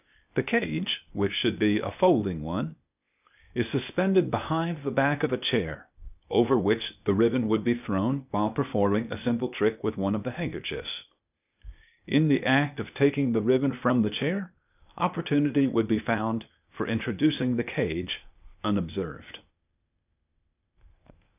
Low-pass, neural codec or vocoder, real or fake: 3.6 kHz; codec, 16 kHz, 0.8 kbps, ZipCodec; fake